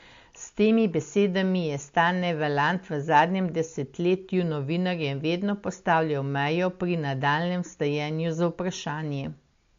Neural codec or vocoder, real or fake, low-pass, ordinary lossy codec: none; real; 7.2 kHz; MP3, 48 kbps